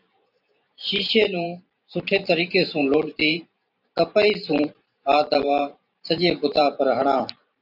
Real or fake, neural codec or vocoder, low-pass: fake; vocoder, 44.1 kHz, 128 mel bands every 512 samples, BigVGAN v2; 5.4 kHz